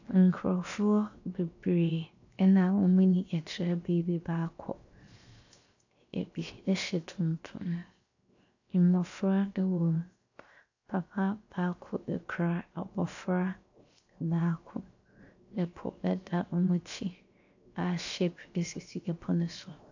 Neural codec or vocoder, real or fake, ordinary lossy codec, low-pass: codec, 16 kHz, 0.7 kbps, FocalCodec; fake; AAC, 48 kbps; 7.2 kHz